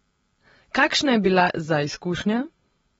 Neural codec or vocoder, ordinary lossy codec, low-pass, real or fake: none; AAC, 24 kbps; 19.8 kHz; real